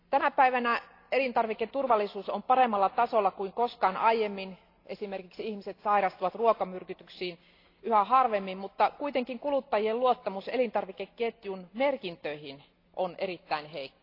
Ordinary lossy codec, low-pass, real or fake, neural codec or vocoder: AAC, 32 kbps; 5.4 kHz; real; none